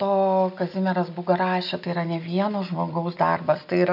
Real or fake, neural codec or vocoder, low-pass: real; none; 5.4 kHz